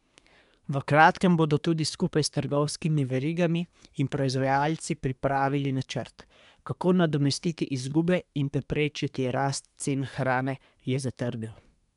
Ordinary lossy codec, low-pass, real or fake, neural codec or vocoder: none; 10.8 kHz; fake; codec, 24 kHz, 1 kbps, SNAC